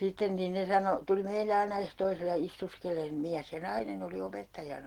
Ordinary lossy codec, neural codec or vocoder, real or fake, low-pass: Opus, 16 kbps; none; real; 19.8 kHz